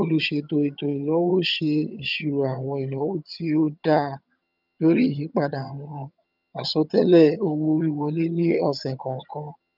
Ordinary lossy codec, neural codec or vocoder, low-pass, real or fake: none; vocoder, 22.05 kHz, 80 mel bands, HiFi-GAN; 5.4 kHz; fake